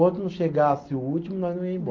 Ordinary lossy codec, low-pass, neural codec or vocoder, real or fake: Opus, 32 kbps; 7.2 kHz; none; real